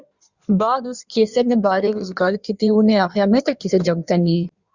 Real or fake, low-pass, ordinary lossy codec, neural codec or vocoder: fake; 7.2 kHz; Opus, 64 kbps; codec, 16 kHz in and 24 kHz out, 1.1 kbps, FireRedTTS-2 codec